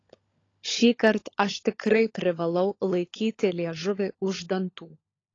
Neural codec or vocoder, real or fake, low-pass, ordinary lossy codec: codec, 16 kHz, 16 kbps, FunCodec, trained on LibriTTS, 50 frames a second; fake; 7.2 kHz; AAC, 32 kbps